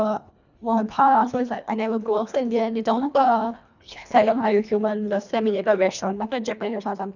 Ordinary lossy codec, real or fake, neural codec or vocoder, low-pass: none; fake; codec, 24 kHz, 1.5 kbps, HILCodec; 7.2 kHz